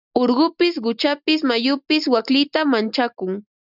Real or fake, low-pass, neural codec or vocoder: real; 5.4 kHz; none